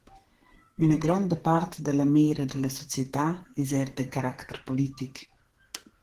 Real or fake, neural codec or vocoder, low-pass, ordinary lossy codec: fake; codec, 32 kHz, 1.9 kbps, SNAC; 14.4 kHz; Opus, 16 kbps